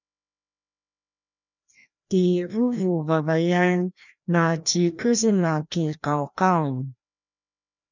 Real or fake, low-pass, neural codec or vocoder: fake; 7.2 kHz; codec, 16 kHz, 1 kbps, FreqCodec, larger model